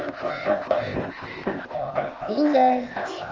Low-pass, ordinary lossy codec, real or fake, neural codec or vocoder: 7.2 kHz; Opus, 24 kbps; fake; codec, 16 kHz, 0.8 kbps, ZipCodec